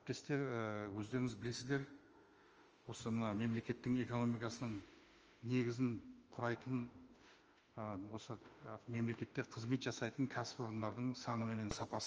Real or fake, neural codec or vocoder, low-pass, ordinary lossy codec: fake; autoencoder, 48 kHz, 32 numbers a frame, DAC-VAE, trained on Japanese speech; 7.2 kHz; Opus, 24 kbps